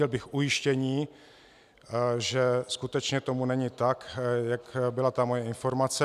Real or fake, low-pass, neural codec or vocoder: real; 14.4 kHz; none